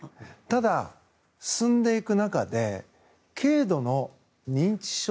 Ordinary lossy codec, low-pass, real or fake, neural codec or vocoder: none; none; real; none